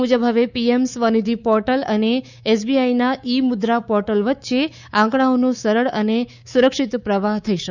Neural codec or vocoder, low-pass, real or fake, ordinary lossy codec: codec, 24 kHz, 3.1 kbps, DualCodec; 7.2 kHz; fake; none